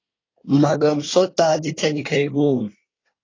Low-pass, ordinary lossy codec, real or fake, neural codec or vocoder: 7.2 kHz; AAC, 32 kbps; fake; codec, 24 kHz, 1 kbps, SNAC